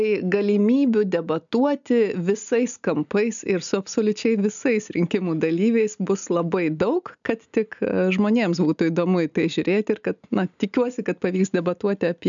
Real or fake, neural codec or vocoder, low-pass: real; none; 7.2 kHz